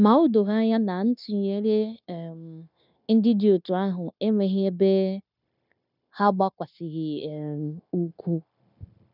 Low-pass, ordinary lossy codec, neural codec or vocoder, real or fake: 5.4 kHz; none; codec, 16 kHz, 0.9 kbps, LongCat-Audio-Codec; fake